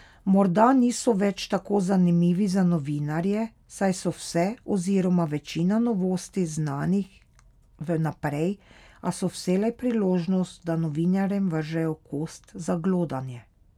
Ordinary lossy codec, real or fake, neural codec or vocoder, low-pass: none; real; none; 19.8 kHz